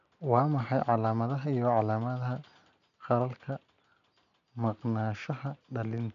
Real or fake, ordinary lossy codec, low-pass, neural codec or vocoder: real; none; 7.2 kHz; none